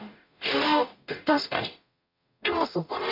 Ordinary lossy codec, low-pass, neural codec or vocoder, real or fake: none; 5.4 kHz; codec, 44.1 kHz, 0.9 kbps, DAC; fake